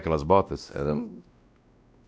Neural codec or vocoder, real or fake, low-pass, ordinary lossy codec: codec, 16 kHz, 1 kbps, X-Codec, WavLM features, trained on Multilingual LibriSpeech; fake; none; none